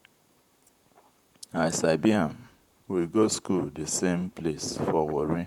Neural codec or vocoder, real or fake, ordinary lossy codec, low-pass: vocoder, 44.1 kHz, 128 mel bands, Pupu-Vocoder; fake; none; 19.8 kHz